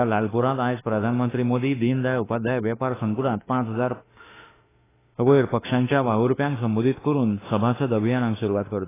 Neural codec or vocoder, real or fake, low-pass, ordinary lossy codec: autoencoder, 48 kHz, 32 numbers a frame, DAC-VAE, trained on Japanese speech; fake; 3.6 kHz; AAC, 16 kbps